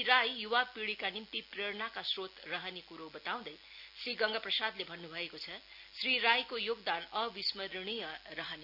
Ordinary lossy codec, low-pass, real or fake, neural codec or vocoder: none; 5.4 kHz; real; none